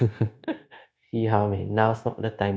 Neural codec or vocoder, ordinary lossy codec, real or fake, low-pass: codec, 16 kHz, 0.9 kbps, LongCat-Audio-Codec; none; fake; none